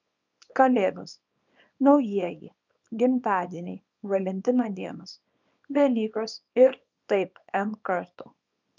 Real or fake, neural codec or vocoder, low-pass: fake; codec, 24 kHz, 0.9 kbps, WavTokenizer, small release; 7.2 kHz